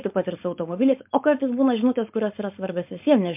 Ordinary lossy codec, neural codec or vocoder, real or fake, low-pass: MP3, 32 kbps; codec, 16 kHz, 4.8 kbps, FACodec; fake; 3.6 kHz